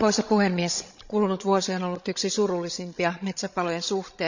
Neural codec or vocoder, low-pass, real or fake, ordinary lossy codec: codec, 16 kHz, 16 kbps, FreqCodec, larger model; 7.2 kHz; fake; none